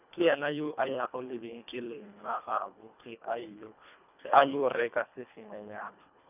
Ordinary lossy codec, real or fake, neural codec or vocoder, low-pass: none; fake; codec, 24 kHz, 1.5 kbps, HILCodec; 3.6 kHz